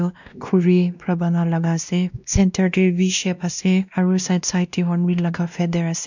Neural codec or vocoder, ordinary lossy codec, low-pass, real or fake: codec, 16 kHz, 1 kbps, X-Codec, WavLM features, trained on Multilingual LibriSpeech; none; 7.2 kHz; fake